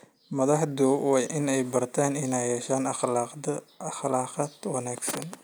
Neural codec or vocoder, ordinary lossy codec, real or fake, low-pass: none; none; real; none